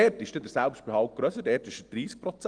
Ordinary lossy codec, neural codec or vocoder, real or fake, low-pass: Opus, 24 kbps; none; real; 9.9 kHz